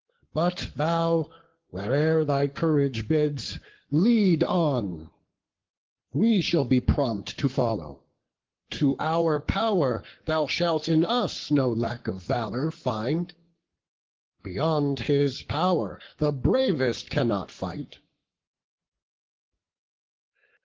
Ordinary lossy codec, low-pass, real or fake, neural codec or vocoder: Opus, 16 kbps; 7.2 kHz; fake; codec, 44.1 kHz, 7.8 kbps, Pupu-Codec